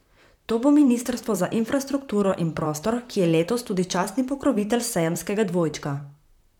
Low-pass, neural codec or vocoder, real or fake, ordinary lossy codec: 19.8 kHz; vocoder, 44.1 kHz, 128 mel bands, Pupu-Vocoder; fake; none